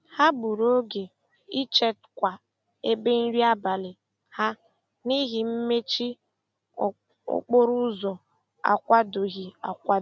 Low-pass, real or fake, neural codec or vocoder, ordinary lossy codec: none; real; none; none